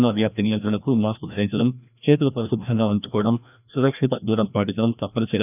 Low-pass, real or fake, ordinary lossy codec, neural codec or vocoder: 3.6 kHz; fake; none; codec, 16 kHz, 1 kbps, FreqCodec, larger model